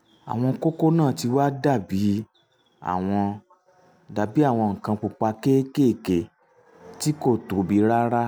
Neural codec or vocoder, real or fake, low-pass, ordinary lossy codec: none; real; none; none